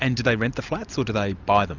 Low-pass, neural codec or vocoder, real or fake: 7.2 kHz; none; real